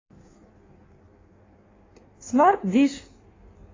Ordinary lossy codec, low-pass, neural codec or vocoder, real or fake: AAC, 32 kbps; 7.2 kHz; codec, 16 kHz in and 24 kHz out, 1.1 kbps, FireRedTTS-2 codec; fake